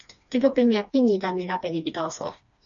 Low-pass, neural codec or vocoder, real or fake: 7.2 kHz; codec, 16 kHz, 2 kbps, FreqCodec, smaller model; fake